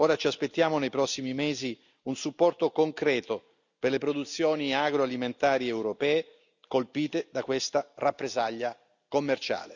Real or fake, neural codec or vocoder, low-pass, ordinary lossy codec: real; none; 7.2 kHz; none